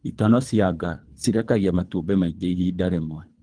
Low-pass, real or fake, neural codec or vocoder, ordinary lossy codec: 9.9 kHz; fake; codec, 24 kHz, 3 kbps, HILCodec; Opus, 24 kbps